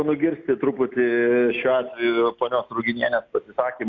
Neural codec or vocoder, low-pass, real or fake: none; 7.2 kHz; real